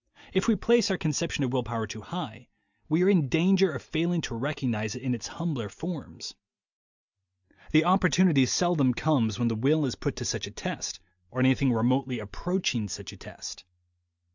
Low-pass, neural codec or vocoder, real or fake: 7.2 kHz; none; real